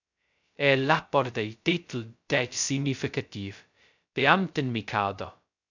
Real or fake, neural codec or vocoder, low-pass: fake; codec, 16 kHz, 0.2 kbps, FocalCodec; 7.2 kHz